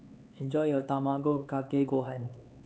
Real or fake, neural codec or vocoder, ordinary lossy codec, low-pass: fake; codec, 16 kHz, 4 kbps, X-Codec, HuBERT features, trained on LibriSpeech; none; none